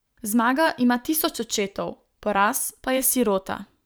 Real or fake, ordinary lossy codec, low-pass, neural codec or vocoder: fake; none; none; vocoder, 44.1 kHz, 128 mel bands every 512 samples, BigVGAN v2